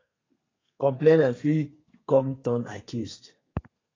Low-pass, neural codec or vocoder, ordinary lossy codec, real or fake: 7.2 kHz; codec, 32 kHz, 1.9 kbps, SNAC; AAC, 32 kbps; fake